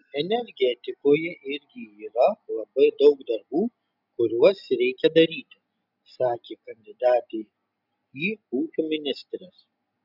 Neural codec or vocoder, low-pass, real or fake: none; 5.4 kHz; real